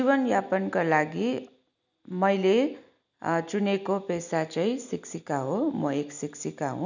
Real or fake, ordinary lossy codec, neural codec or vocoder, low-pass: real; none; none; 7.2 kHz